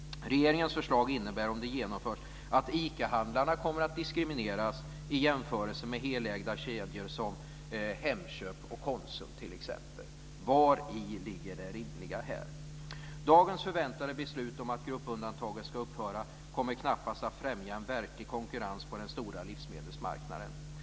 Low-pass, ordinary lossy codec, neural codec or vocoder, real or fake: none; none; none; real